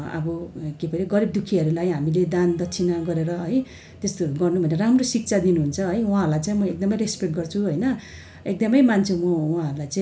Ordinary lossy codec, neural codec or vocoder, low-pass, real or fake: none; none; none; real